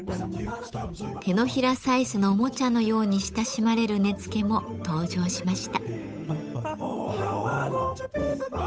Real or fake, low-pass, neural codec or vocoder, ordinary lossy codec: fake; none; codec, 16 kHz, 8 kbps, FunCodec, trained on Chinese and English, 25 frames a second; none